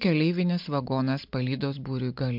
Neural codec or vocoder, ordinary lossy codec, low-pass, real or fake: none; MP3, 48 kbps; 5.4 kHz; real